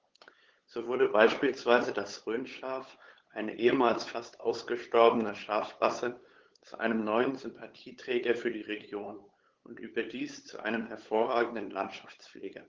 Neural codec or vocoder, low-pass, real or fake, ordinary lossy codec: codec, 16 kHz, 8 kbps, FunCodec, trained on LibriTTS, 25 frames a second; 7.2 kHz; fake; Opus, 16 kbps